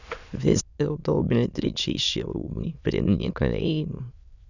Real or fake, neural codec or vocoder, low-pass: fake; autoencoder, 22.05 kHz, a latent of 192 numbers a frame, VITS, trained on many speakers; 7.2 kHz